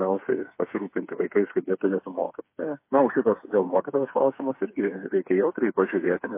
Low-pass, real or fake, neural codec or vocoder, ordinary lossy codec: 3.6 kHz; fake; codec, 16 kHz, 4 kbps, FreqCodec, smaller model; MP3, 24 kbps